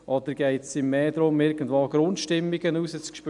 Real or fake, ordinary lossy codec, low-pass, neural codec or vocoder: real; none; 10.8 kHz; none